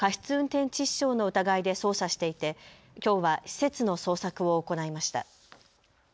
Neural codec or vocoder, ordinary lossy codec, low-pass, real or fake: none; none; none; real